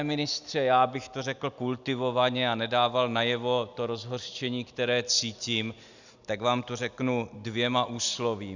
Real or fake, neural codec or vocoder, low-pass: real; none; 7.2 kHz